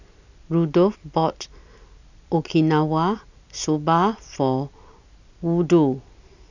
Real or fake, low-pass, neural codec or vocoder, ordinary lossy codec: real; 7.2 kHz; none; none